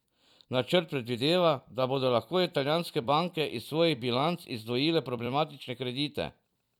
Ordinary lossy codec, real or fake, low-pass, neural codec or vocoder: none; fake; 19.8 kHz; vocoder, 44.1 kHz, 128 mel bands every 256 samples, BigVGAN v2